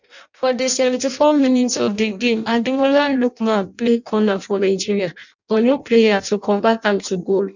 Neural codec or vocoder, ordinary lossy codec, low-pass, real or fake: codec, 16 kHz in and 24 kHz out, 0.6 kbps, FireRedTTS-2 codec; none; 7.2 kHz; fake